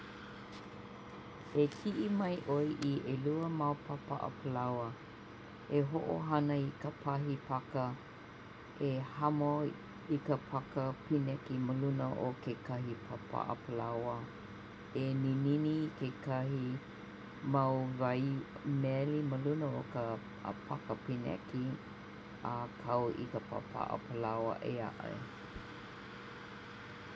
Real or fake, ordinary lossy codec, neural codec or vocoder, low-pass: real; none; none; none